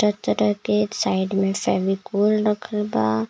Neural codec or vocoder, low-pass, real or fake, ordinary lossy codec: none; none; real; none